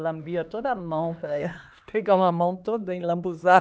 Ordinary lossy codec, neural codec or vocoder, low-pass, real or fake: none; codec, 16 kHz, 2 kbps, X-Codec, HuBERT features, trained on LibriSpeech; none; fake